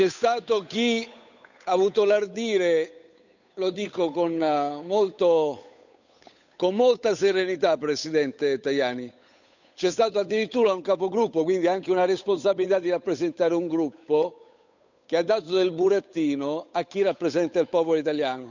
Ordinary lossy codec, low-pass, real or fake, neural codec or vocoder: none; 7.2 kHz; fake; codec, 16 kHz, 8 kbps, FunCodec, trained on Chinese and English, 25 frames a second